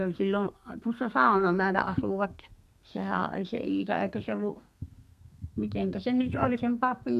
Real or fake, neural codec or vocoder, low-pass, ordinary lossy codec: fake; codec, 32 kHz, 1.9 kbps, SNAC; 14.4 kHz; none